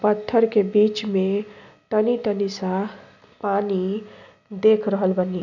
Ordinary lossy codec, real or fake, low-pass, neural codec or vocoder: none; real; 7.2 kHz; none